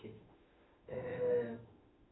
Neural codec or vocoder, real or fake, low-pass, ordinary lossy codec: autoencoder, 48 kHz, 32 numbers a frame, DAC-VAE, trained on Japanese speech; fake; 7.2 kHz; AAC, 16 kbps